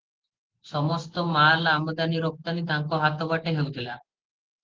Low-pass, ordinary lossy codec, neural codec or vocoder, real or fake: 7.2 kHz; Opus, 16 kbps; none; real